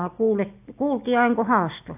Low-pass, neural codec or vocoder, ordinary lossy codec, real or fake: 3.6 kHz; none; AAC, 32 kbps; real